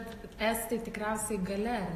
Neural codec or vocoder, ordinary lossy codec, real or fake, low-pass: none; AAC, 48 kbps; real; 14.4 kHz